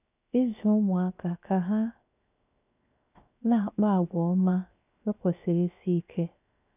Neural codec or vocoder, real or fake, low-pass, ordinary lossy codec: codec, 16 kHz, 0.7 kbps, FocalCodec; fake; 3.6 kHz; none